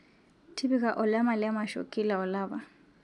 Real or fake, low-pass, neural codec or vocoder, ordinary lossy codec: real; 10.8 kHz; none; none